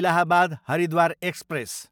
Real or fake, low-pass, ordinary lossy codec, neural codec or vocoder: real; 19.8 kHz; none; none